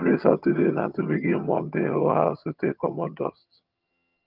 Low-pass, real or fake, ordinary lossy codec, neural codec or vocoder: 5.4 kHz; fake; Opus, 24 kbps; vocoder, 22.05 kHz, 80 mel bands, HiFi-GAN